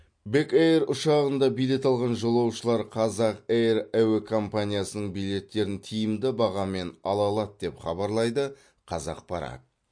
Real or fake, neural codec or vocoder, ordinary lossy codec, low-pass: real; none; MP3, 48 kbps; 9.9 kHz